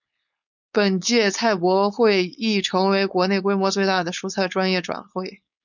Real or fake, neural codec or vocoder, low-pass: fake; codec, 16 kHz, 4.8 kbps, FACodec; 7.2 kHz